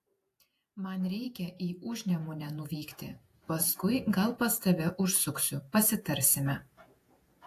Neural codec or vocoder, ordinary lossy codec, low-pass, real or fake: vocoder, 48 kHz, 128 mel bands, Vocos; AAC, 64 kbps; 14.4 kHz; fake